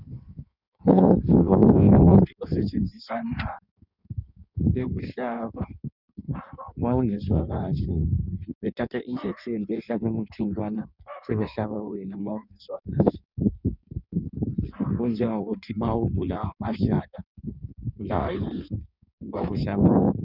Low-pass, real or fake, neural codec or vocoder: 5.4 kHz; fake; codec, 16 kHz in and 24 kHz out, 1.1 kbps, FireRedTTS-2 codec